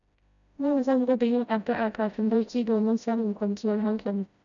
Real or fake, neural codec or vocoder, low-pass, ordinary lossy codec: fake; codec, 16 kHz, 0.5 kbps, FreqCodec, smaller model; 7.2 kHz; none